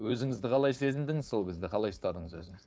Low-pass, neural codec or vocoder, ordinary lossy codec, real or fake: none; codec, 16 kHz, 4.8 kbps, FACodec; none; fake